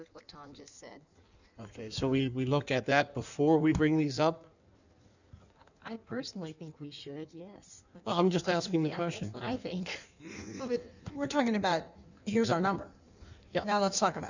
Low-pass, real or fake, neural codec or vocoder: 7.2 kHz; fake; codec, 16 kHz in and 24 kHz out, 1.1 kbps, FireRedTTS-2 codec